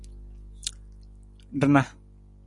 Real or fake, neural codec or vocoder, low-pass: real; none; 10.8 kHz